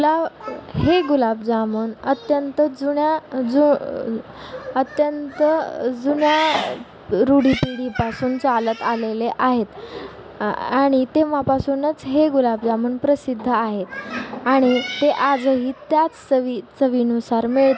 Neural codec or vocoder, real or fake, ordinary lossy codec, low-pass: none; real; none; none